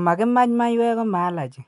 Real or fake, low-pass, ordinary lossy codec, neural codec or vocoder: real; 10.8 kHz; none; none